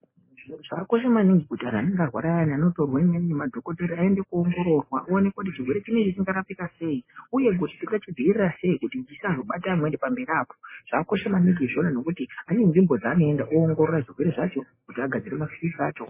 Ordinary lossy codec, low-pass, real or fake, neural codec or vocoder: MP3, 16 kbps; 3.6 kHz; real; none